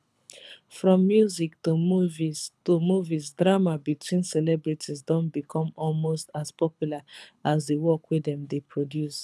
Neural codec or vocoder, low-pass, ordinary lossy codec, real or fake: codec, 24 kHz, 6 kbps, HILCodec; none; none; fake